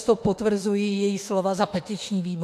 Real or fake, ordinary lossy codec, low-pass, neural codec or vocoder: fake; AAC, 64 kbps; 14.4 kHz; autoencoder, 48 kHz, 32 numbers a frame, DAC-VAE, trained on Japanese speech